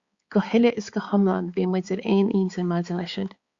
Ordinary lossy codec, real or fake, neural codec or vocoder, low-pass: Opus, 64 kbps; fake; codec, 16 kHz, 4 kbps, X-Codec, HuBERT features, trained on balanced general audio; 7.2 kHz